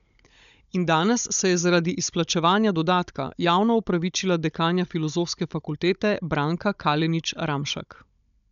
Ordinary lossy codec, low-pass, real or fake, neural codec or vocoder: none; 7.2 kHz; fake; codec, 16 kHz, 16 kbps, FunCodec, trained on Chinese and English, 50 frames a second